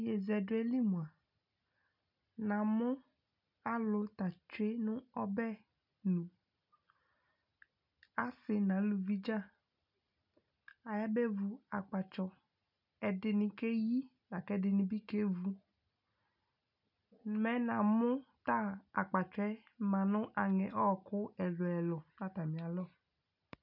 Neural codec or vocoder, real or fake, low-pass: none; real; 5.4 kHz